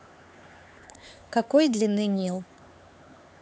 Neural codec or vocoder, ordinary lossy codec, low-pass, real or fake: codec, 16 kHz, 4 kbps, X-Codec, HuBERT features, trained on LibriSpeech; none; none; fake